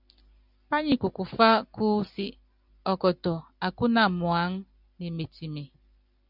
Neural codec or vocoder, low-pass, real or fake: none; 5.4 kHz; real